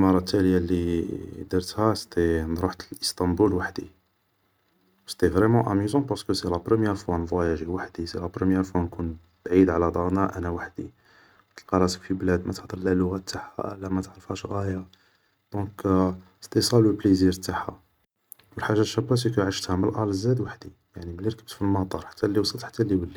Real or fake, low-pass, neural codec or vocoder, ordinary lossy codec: real; 19.8 kHz; none; none